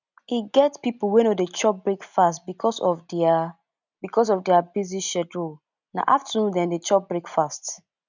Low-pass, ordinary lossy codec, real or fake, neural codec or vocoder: 7.2 kHz; none; real; none